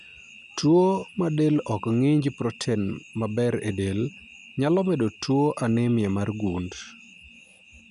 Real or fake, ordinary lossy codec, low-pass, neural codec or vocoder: real; none; 10.8 kHz; none